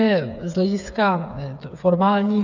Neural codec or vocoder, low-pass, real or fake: codec, 16 kHz, 8 kbps, FreqCodec, smaller model; 7.2 kHz; fake